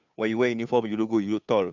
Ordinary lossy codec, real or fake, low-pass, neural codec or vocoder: none; fake; 7.2 kHz; codec, 16 kHz, 2 kbps, FunCodec, trained on Chinese and English, 25 frames a second